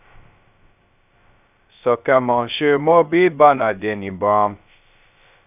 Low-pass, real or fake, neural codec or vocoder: 3.6 kHz; fake; codec, 16 kHz, 0.2 kbps, FocalCodec